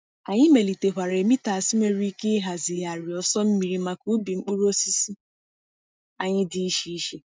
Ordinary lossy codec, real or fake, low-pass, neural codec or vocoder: none; real; none; none